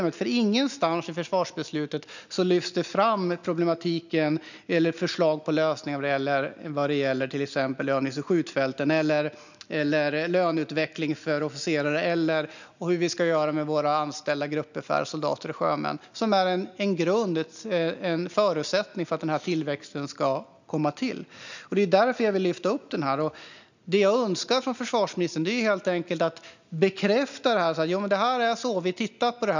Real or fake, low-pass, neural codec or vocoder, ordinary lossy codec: real; 7.2 kHz; none; none